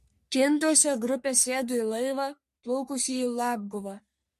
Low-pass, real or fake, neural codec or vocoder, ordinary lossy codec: 14.4 kHz; fake; codec, 44.1 kHz, 3.4 kbps, Pupu-Codec; MP3, 64 kbps